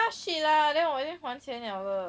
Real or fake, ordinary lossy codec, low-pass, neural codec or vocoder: real; none; none; none